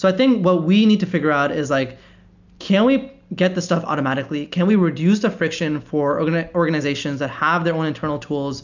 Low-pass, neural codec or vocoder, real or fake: 7.2 kHz; none; real